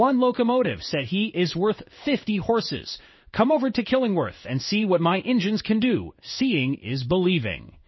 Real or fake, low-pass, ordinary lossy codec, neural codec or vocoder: fake; 7.2 kHz; MP3, 24 kbps; codec, 16 kHz in and 24 kHz out, 1 kbps, XY-Tokenizer